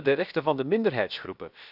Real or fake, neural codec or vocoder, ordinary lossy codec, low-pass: fake; codec, 16 kHz, 0.7 kbps, FocalCodec; none; 5.4 kHz